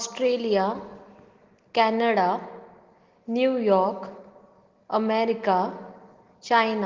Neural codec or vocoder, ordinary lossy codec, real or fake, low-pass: none; Opus, 16 kbps; real; 7.2 kHz